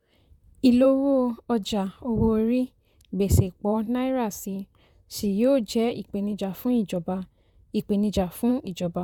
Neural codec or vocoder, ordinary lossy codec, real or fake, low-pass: vocoder, 44.1 kHz, 128 mel bands, Pupu-Vocoder; none; fake; 19.8 kHz